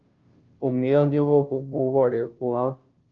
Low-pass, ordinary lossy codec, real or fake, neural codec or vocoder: 7.2 kHz; Opus, 24 kbps; fake; codec, 16 kHz, 0.5 kbps, FunCodec, trained on Chinese and English, 25 frames a second